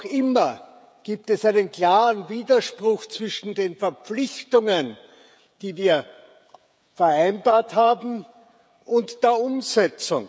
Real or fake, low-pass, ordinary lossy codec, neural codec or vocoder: fake; none; none; codec, 16 kHz, 16 kbps, FreqCodec, smaller model